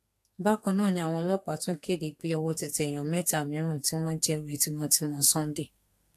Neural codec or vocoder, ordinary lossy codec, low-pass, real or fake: codec, 32 kHz, 1.9 kbps, SNAC; AAC, 64 kbps; 14.4 kHz; fake